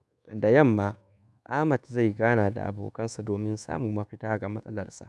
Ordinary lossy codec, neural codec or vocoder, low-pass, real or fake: none; codec, 24 kHz, 1.2 kbps, DualCodec; none; fake